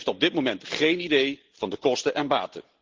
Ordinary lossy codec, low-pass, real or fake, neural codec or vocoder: Opus, 16 kbps; 7.2 kHz; real; none